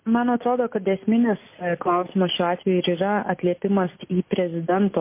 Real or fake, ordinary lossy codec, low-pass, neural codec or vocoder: real; MP3, 24 kbps; 3.6 kHz; none